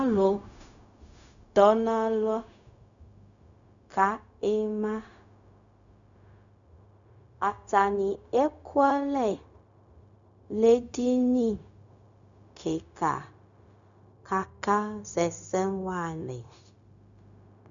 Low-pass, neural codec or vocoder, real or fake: 7.2 kHz; codec, 16 kHz, 0.4 kbps, LongCat-Audio-Codec; fake